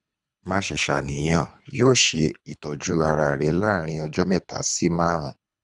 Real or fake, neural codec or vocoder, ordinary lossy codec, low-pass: fake; codec, 24 kHz, 3 kbps, HILCodec; none; 10.8 kHz